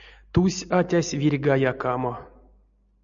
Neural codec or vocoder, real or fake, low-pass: none; real; 7.2 kHz